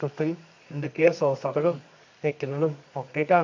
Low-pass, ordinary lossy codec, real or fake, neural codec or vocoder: 7.2 kHz; MP3, 64 kbps; fake; codec, 24 kHz, 0.9 kbps, WavTokenizer, medium music audio release